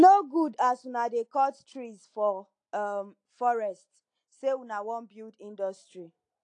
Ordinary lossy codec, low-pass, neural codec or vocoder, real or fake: AAC, 48 kbps; 9.9 kHz; none; real